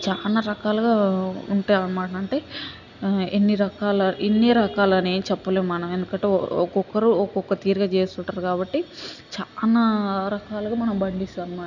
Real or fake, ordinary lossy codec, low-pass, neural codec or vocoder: real; none; 7.2 kHz; none